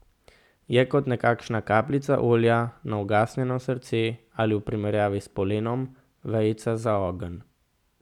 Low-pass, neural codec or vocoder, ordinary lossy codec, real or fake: 19.8 kHz; none; none; real